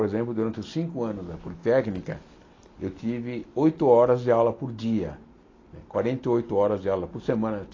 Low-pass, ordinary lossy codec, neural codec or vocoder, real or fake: 7.2 kHz; AAC, 32 kbps; none; real